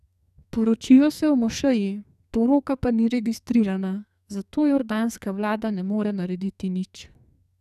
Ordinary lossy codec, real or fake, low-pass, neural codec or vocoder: none; fake; 14.4 kHz; codec, 44.1 kHz, 2.6 kbps, SNAC